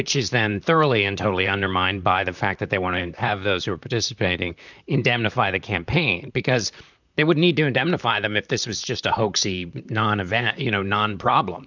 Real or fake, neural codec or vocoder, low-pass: fake; vocoder, 44.1 kHz, 128 mel bands, Pupu-Vocoder; 7.2 kHz